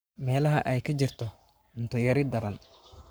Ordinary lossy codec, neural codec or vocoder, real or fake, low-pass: none; codec, 44.1 kHz, 7.8 kbps, Pupu-Codec; fake; none